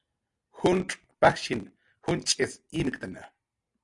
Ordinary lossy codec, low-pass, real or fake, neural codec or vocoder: MP3, 96 kbps; 10.8 kHz; real; none